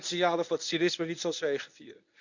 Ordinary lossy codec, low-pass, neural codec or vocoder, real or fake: none; 7.2 kHz; codec, 24 kHz, 0.9 kbps, WavTokenizer, medium speech release version 1; fake